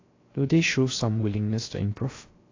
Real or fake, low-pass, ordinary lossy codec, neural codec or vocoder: fake; 7.2 kHz; AAC, 32 kbps; codec, 16 kHz, 0.3 kbps, FocalCodec